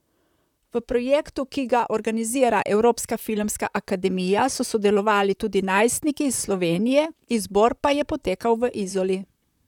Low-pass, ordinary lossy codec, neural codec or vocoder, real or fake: 19.8 kHz; none; vocoder, 44.1 kHz, 128 mel bands, Pupu-Vocoder; fake